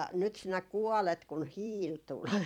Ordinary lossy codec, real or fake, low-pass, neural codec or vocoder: none; fake; 19.8 kHz; vocoder, 44.1 kHz, 128 mel bands every 256 samples, BigVGAN v2